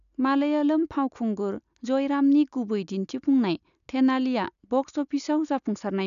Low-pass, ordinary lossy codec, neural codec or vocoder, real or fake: 7.2 kHz; none; none; real